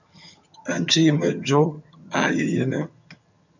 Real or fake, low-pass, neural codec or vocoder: fake; 7.2 kHz; vocoder, 22.05 kHz, 80 mel bands, HiFi-GAN